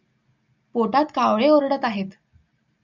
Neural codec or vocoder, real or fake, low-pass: none; real; 7.2 kHz